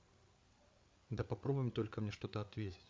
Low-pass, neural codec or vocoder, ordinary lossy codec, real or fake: none; codec, 16 kHz, 8 kbps, FreqCodec, larger model; none; fake